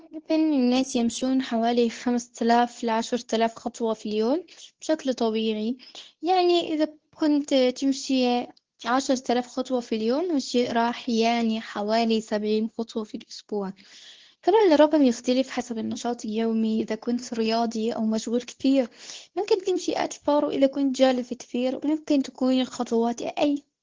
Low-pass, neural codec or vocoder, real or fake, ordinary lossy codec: 7.2 kHz; codec, 24 kHz, 0.9 kbps, WavTokenizer, medium speech release version 2; fake; Opus, 16 kbps